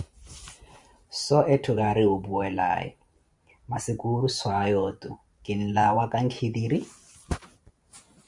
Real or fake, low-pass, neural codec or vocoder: fake; 10.8 kHz; vocoder, 44.1 kHz, 128 mel bands every 512 samples, BigVGAN v2